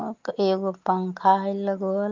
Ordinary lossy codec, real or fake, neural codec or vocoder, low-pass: Opus, 24 kbps; real; none; 7.2 kHz